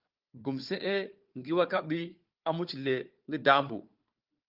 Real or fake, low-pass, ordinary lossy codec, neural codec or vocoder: fake; 5.4 kHz; Opus, 32 kbps; codec, 16 kHz, 4 kbps, FunCodec, trained on Chinese and English, 50 frames a second